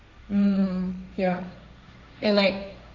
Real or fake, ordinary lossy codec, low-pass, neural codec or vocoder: fake; AAC, 48 kbps; 7.2 kHz; codec, 16 kHz, 1.1 kbps, Voila-Tokenizer